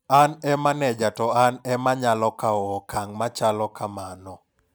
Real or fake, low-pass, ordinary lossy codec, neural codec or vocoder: real; none; none; none